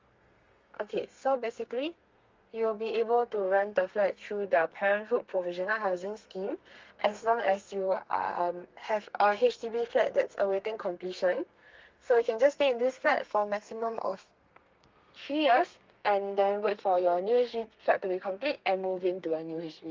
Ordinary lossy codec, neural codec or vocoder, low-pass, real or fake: Opus, 32 kbps; codec, 32 kHz, 1.9 kbps, SNAC; 7.2 kHz; fake